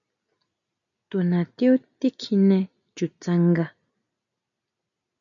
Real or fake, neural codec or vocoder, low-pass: real; none; 7.2 kHz